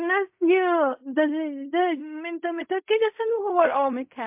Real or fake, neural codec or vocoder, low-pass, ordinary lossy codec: fake; codec, 16 kHz in and 24 kHz out, 0.4 kbps, LongCat-Audio-Codec, fine tuned four codebook decoder; 3.6 kHz; MP3, 32 kbps